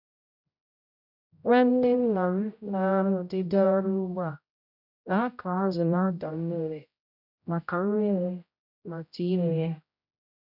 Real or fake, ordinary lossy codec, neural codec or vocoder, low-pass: fake; none; codec, 16 kHz, 0.5 kbps, X-Codec, HuBERT features, trained on general audio; 5.4 kHz